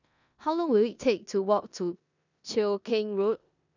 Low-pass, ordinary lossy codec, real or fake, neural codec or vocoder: 7.2 kHz; none; fake; codec, 16 kHz in and 24 kHz out, 0.9 kbps, LongCat-Audio-Codec, four codebook decoder